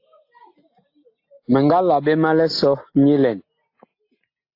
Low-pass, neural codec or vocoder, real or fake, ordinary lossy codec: 5.4 kHz; none; real; AAC, 32 kbps